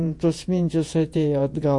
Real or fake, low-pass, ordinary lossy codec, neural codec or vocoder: fake; 10.8 kHz; MP3, 48 kbps; vocoder, 48 kHz, 128 mel bands, Vocos